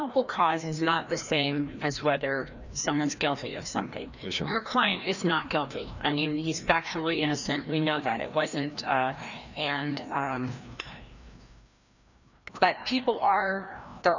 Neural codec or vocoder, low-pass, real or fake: codec, 16 kHz, 1 kbps, FreqCodec, larger model; 7.2 kHz; fake